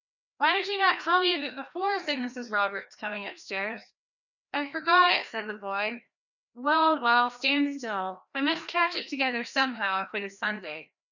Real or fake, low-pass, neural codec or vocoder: fake; 7.2 kHz; codec, 16 kHz, 1 kbps, FreqCodec, larger model